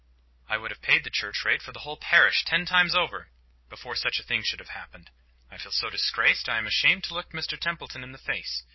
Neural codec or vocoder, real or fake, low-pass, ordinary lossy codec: none; real; 7.2 kHz; MP3, 24 kbps